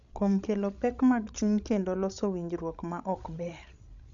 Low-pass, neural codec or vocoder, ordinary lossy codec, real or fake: 7.2 kHz; codec, 16 kHz, 16 kbps, FreqCodec, larger model; none; fake